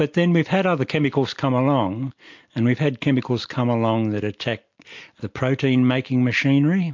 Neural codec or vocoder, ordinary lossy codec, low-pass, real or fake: none; MP3, 48 kbps; 7.2 kHz; real